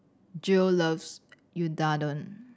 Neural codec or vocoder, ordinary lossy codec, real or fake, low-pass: none; none; real; none